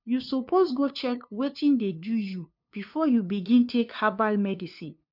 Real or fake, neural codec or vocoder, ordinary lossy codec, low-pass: fake; codec, 16 kHz, 2 kbps, FunCodec, trained on LibriTTS, 25 frames a second; none; 5.4 kHz